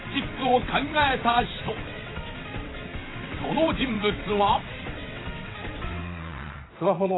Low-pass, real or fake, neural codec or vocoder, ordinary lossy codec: 7.2 kHz; fake; vocoder, 22.05 kHz, 80 mel bands, WaveNeXt; AAC, 16 kbps